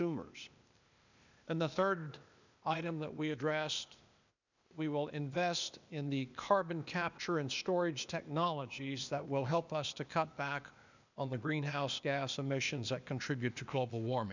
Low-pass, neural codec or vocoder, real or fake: 7.2 kHz; codec, 16 kHz, 0.8 kbps, ZipCodec; fake